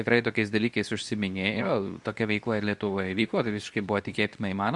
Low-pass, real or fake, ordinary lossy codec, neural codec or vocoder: 10.8 kHz; fake; Opus, 64 kbps; codec, 24 kHz, 0.9 kbps, WavTokenizer, medium speech release version 2